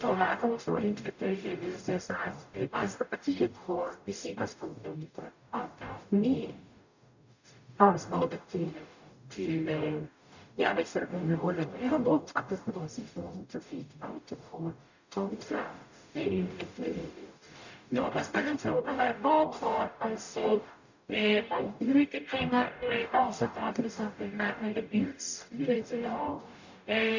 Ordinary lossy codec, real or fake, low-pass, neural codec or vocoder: none; fake; 7.2 kHz; codec, 44.1 kHz, 0.9 kbps, DAC